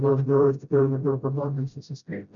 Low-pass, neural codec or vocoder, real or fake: 7.2 kHz; codec, 16 kHz, 0.5 kbps, FreqCodec, smaller model; fake